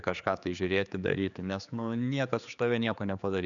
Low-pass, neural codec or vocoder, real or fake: 7.2 kHz; codec, 16 kHz, 4 kbps, X-Codec, HuBERT features, trained on general audio; fake